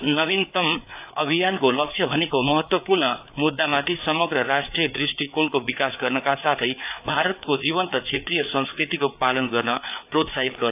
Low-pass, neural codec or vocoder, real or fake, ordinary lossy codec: 3.6 kHz; codec, 16 kHz, 4 kbps, FreqCodec, larger model; fake; AAC, 32 kbps